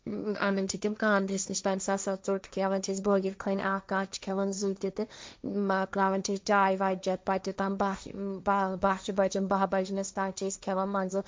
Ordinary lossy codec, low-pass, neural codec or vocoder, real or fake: none; none; codec, 16 kHz, 1.1 kbps, Voila-Tokenizer; fake